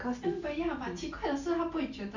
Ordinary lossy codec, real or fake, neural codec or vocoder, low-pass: none; real; none; 7.2 kHz